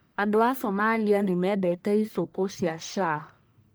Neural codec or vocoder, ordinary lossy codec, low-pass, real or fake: codec, 44.1 kHz, 1.7 kbps, Pupu-Codec; none; none; fake